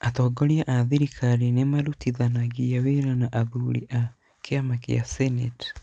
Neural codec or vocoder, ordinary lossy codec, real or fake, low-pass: none; none; real; 9.9 kHz